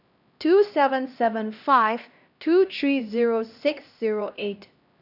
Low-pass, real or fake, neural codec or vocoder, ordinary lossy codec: 5.4 kHz; fake; codec, 16 kHz, 1 kbps, X-Codec, HuBERT features, trained on LibriSpeech; none